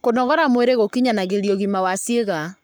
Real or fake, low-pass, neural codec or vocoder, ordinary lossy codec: fake; none; codec, 44.1 kHz, 7.8 kbps, Pupu-Codec; none